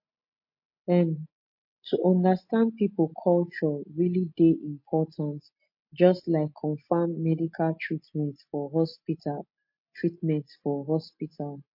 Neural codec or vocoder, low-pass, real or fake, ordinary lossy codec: none; 5.4 kHz; real; MP3, 32 kbps